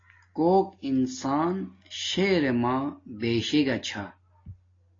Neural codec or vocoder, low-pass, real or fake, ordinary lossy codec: none; 7.2 kHz; real; AAC, 32 kbps